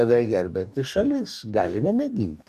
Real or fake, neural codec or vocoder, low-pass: fake; codec, 44.1 kHz, 2.6 kbps, DAC; 14.4 kHz